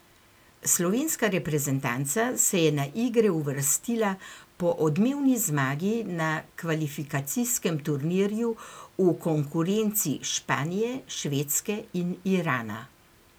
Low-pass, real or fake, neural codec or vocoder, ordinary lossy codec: none; real; none; none